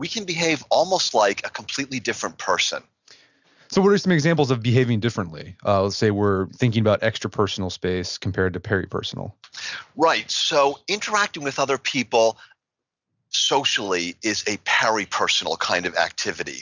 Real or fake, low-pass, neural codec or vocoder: real; 7.2 kHz; none